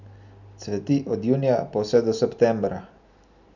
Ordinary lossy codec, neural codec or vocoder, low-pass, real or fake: none; none; 7.2 kHz; real